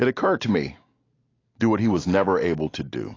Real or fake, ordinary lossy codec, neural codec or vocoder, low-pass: real; AAC, 32 kbps; none; 7.2 kHz